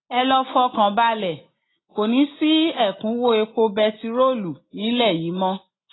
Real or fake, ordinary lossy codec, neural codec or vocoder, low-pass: real; AAC, 16 kbps; none; 7.2 kHz